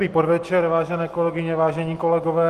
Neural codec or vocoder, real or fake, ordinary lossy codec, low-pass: none; real; Opus, 24 kbps; 14.4 kHz